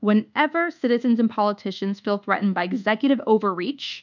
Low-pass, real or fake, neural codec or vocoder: 7.2 kHz; fake; codec, 24 kHz, 1.2 kbps, DualCodec